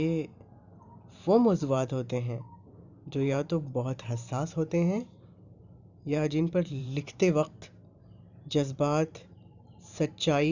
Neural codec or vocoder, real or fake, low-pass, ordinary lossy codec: vocoder, 44.1 kHz, 128 mel bands every 512 samples, BigVGAN v2; fake; 7.2 kHz; none